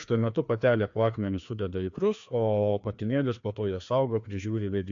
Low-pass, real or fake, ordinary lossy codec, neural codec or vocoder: 7.2 kHz; fake; AAC, 64 kbps; codec, 16 kHz, 2 kbps, FreqCodec, larger model